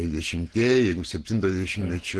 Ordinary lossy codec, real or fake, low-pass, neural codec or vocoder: Opus, 16 kbps; fake; 10.8 kHz; vocoder, 48 kHz, 128 mel bands, Vocos